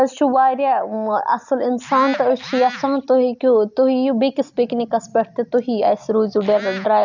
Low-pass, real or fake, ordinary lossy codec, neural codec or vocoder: 7.2 kHz; real; none; none